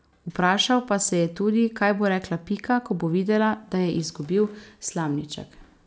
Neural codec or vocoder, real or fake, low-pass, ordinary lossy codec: none; real; none; none